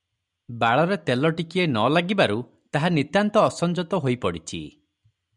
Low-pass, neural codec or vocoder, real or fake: 10.8 kHz; none; real